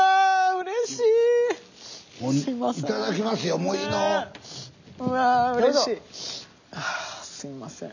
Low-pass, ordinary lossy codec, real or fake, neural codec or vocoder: 7.2 kHz; none; real; none